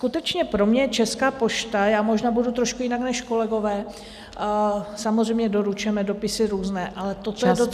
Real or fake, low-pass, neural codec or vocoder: real; 14.4 kHz; none